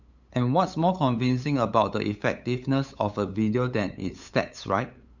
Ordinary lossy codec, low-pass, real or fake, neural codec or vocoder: none; 7.2 kHz; fake; codec, 16 kHz, 8 kbps, FunCodec, trained on LibriTTS, 25 frames a second